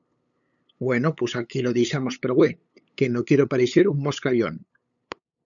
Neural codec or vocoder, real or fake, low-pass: codec, 16 kHz, 8 kbps, FunCodec, trained on LibriTTS, 25 frames a second; fake; 7.2 kHz